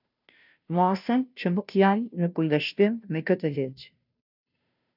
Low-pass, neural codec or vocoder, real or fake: 5.4 kHz; codec, 16 kHz, 0.5 kbps, FunCodec, trained on Chinese and English, 25 frames a second; fake